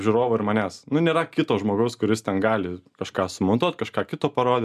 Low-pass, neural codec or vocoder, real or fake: 14.4 kHz; none; real